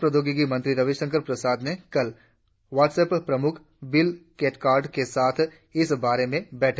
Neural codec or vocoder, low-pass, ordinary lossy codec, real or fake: none; none; none; real